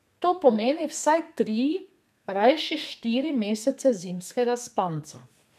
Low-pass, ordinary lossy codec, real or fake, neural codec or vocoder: 14.4 kHz; none; fake; codec, 32 kHz, 1.9 kbps, SNAC